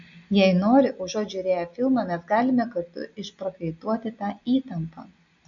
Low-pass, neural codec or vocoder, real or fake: 7.2 kHz; none; real